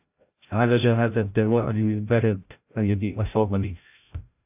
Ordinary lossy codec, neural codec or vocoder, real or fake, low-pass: AAC, 32 kbps; codec, 16 kHz, 0.5 kbps, FreqCodec, larger model; fake; 3.6 kHz